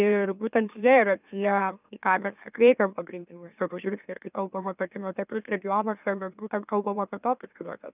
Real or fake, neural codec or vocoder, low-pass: fake; autoencoder, 44.1 kHz, a latent of 192 numbers a frame, MeloTTS; 3.6 kHz